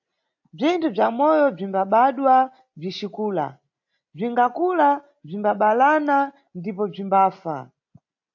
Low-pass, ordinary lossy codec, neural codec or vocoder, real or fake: 7.2 kHz; AAC, 48 kbps; none; real